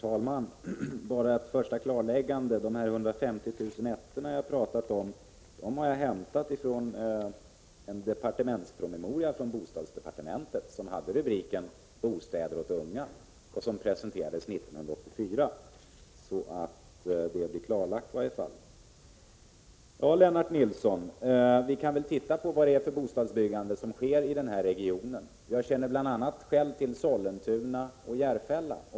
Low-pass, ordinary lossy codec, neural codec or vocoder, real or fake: none; none; none; real